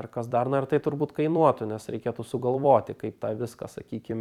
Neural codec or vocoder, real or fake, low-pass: vocoder, 44.1 kHz, 128 mel bands every 256 samples, BigVGAN v2; fake; 19.8 kHz